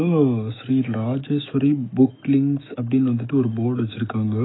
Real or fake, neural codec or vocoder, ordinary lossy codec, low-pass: fake; autoencoder, 48 kHz, 128 numbers a frame, DAC-VAE, trained on Japanese speech; AAC, 16 kbps; 7.2 kHz